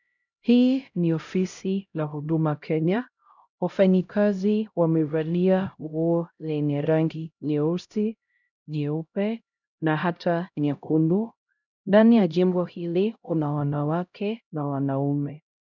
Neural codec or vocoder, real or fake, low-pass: codec, 16 kHz, 0.5 kbps, X-Codec, HuBERT features, trained on LibriSpeech; fake; 7.2 kHz